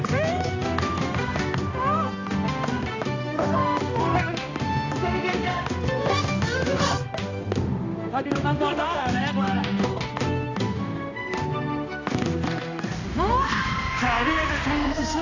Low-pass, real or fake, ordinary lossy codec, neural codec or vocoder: 7.2 kHz; fake; AAC, 48 kbps; codec, 16 kHz, 1 kbps, X-Codec, HuBERT features, trained on balanced general audio